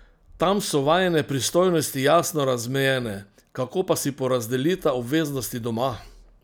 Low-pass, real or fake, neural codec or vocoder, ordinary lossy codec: none; real; none; none